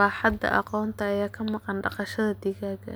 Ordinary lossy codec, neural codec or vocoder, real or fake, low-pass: none; none; real; none